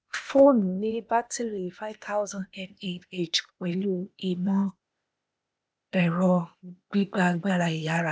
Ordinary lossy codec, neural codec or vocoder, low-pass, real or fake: none; codec, 16 kHz, 0.8 kbps, ZipCodec; none; fake